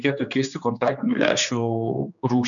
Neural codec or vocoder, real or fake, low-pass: codec, 16 kHz, 2 kbps, X-Codec, HuBERT features, trained on general audio; fake; 7.2 kHz